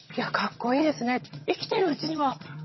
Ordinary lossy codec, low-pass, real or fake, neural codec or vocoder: MP3, 24 kbps; 7.2 kHz; fake; vocoder, 22.05 kHz, 80 mel bands, HiFi-GAN